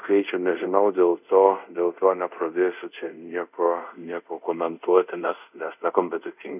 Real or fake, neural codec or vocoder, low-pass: fake; codec, 24 kHz, 0.5 kbps, DualCodec; 3.6 kHz